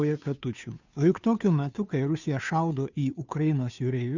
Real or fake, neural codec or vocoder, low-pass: fake; codec, 16 kHz, 2 kbps, FunCodec, trained on Chinese and English, 25 frames a second; 7.2 kHz